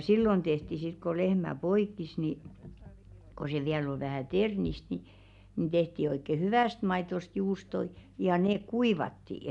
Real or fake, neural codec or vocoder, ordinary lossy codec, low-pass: real; none; none; 10.8 kHz